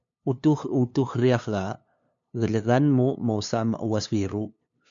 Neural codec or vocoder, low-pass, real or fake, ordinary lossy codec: codec, 16 kHz, 2 kbps, FunCodec, trained on LibriTTS, 25 frames a second; 7.2 kHz; fake; MP3, 64 kbps